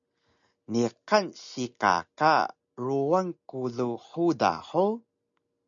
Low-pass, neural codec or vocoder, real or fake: 7.2 kHz; none; real